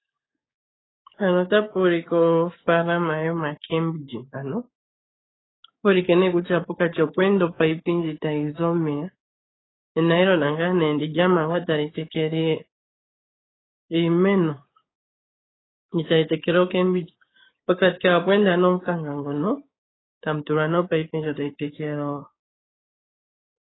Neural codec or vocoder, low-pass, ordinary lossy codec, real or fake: codec, 16 kHz, 6 kbps, DAC; 7.2 kHz; AAC, 16 kbps; fake